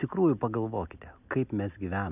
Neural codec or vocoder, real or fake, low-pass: none; real; 3.6 kHz